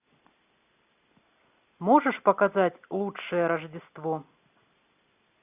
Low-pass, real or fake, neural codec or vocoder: 3.6 kHz; real; none